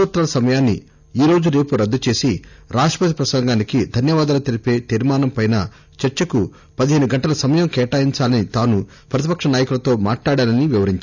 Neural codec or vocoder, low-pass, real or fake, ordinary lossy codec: none; 7.2 kHz; real; none